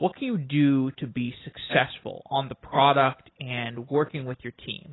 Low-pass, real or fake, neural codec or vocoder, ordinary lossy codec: 7.2 kHz; real; none; AAC, 16 kbps